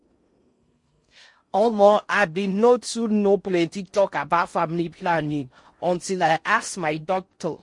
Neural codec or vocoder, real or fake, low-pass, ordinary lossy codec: codec, 16 kHz in and 24 kHz out, 0.6 kbps, FocalCodec, streaming, 4096 codes; fake; 10.8 kHz; MP3, 48 kbps